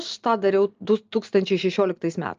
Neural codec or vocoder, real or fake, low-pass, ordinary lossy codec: none; real; 7.2 kHz; Opus, 24 kbps